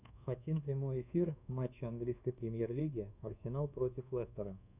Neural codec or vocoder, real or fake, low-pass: codec, 24 kHz, 1.2 kbps, DualCodec; fake; 3.6 kHz